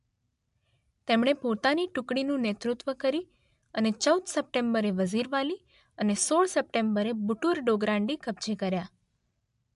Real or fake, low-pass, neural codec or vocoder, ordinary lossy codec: real; 10.8 kHz; none; MP3, 64 kbps